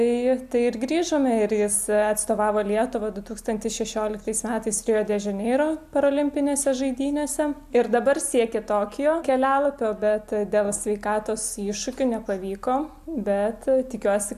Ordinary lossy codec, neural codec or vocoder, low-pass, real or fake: AAC, 96 kbps; none; 14.4 kHz; real